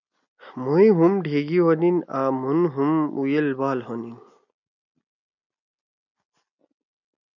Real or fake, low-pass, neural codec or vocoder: real; 7.2 kHz; none